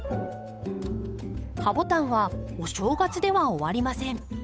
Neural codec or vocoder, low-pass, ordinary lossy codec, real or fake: codec, 16 kHz, 8 kbps, FunCodec, trained on Chinese and English, 25 frames a second; none; none; fake